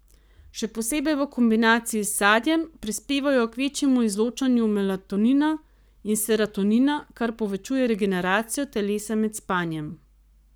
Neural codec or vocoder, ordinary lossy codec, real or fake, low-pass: codec, 44.1 kHz, 7.8 kbps, Pupu-Codec; none; fake; none